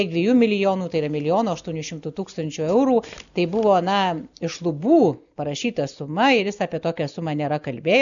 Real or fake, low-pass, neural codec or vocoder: real; 7.2 kHz; none